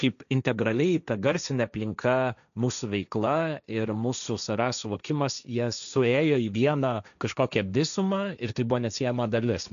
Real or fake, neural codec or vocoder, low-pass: fake; codec, 16 kHz, 1.1 kbps, Voila-Tokenizer; 7.2 kHz